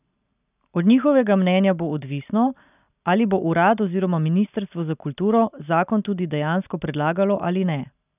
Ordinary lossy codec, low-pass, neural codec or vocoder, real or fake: none; 3.6 kHz; none; real